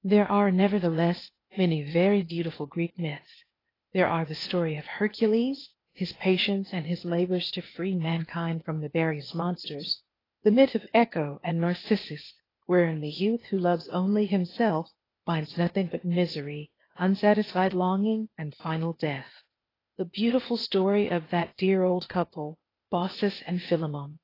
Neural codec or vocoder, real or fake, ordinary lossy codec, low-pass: codec, 16 kHz, 0.8 kbps, ZipCodec; fake; AAC, 24 kbps; 5.4 kHz